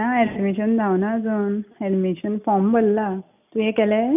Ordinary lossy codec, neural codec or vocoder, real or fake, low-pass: none; none; real; 3.6 kHz